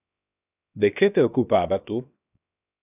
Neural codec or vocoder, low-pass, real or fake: codec, 16 kHz, 0.7 kbps, FocalCodec; 3.6 kHz; fake